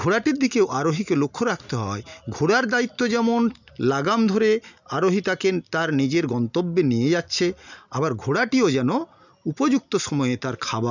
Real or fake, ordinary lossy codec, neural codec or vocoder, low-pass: real; none; none; 7.2 kHz